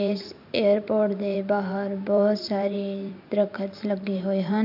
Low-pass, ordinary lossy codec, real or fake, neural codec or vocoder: 5.4 kHz; none; fake; vocoder, 44.1 kHz, 128 mel bands every 512 samples, BigVGAN v2